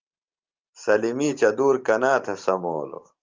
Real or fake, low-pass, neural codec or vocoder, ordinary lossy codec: fake; 7.2 kHz; vocoder, 44.1 kHz, 128 mel bands every 512 samples, BigVGAN v2; Opus, 24 kbps